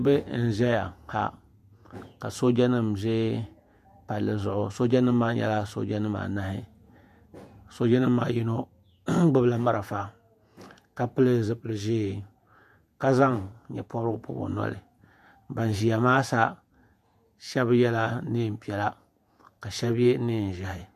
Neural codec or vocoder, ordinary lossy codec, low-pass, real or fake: vocoder, 48 kHz, 128 mel bands, Vocos; MP3, 96 kbps; 14.4 kHz; fake